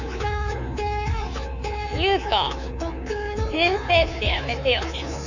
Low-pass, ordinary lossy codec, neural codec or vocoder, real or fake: 7.2 kHz; none; codec, 24 kHz, 3.1 kbps, DualCodec; fake